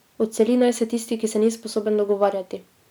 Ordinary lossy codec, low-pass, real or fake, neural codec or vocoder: none; none; real; none